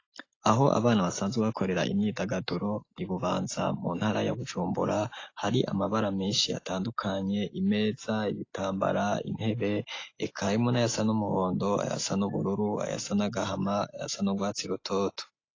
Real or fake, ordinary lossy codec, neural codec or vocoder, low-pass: real; AAC, 32 kbps; none; 7.2 kHz